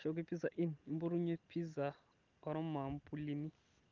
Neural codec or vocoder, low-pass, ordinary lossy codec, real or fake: none; 7.2 kHz; Opus, 32 kbps; real